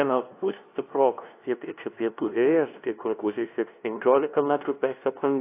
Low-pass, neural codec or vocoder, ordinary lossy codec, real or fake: 3.6 kHz; codec, 16 kHz, 0.5 kbps, FunCodec, trained on LibriTTS, 25 frames a second; AAC, 24 kbps; fake